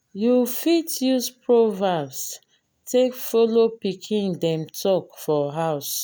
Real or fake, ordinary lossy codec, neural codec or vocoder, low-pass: real; none; none; none